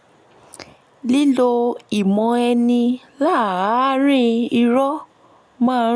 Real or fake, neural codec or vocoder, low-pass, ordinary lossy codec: real; none; none; none